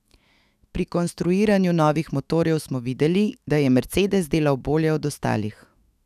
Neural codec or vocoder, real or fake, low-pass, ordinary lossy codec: autoencoder, 48 kHz, 128 numbers a frame, DAC-VAE, trained on Japanese speech; fake; 14.4 kHz; none